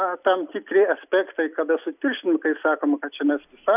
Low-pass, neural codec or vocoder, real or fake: 3.6 kHz; none; real